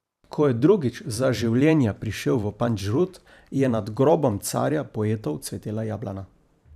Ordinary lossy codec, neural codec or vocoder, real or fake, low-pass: none; vocoder, 44.1 kHz, 128 mel bands every 512 samples, BigVGAN v2; fake; 14.4 kHz